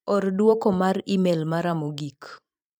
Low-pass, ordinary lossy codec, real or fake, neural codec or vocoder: none; none; fake; vocoder, 44.1 kHz, 128 mel bands every 256 samples, BigVGAN v2